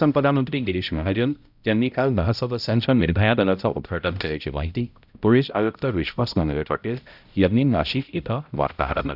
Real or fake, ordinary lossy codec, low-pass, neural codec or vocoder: fake; none; 5.4 kHz; codec, 16 kHz, 0.5 kbps, X-Codec, HuBERT features, trained on balanced general audio